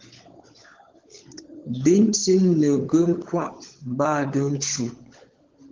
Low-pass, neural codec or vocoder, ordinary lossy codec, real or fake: 7.2 kHz; codec, 16 kHz, 16 kbps, FunCodec, trained on LibriTTS, 50 frames a second; Opus, 16 kbps; fake